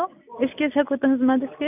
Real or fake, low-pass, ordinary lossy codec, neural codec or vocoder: real; 3.6 kHz; none; none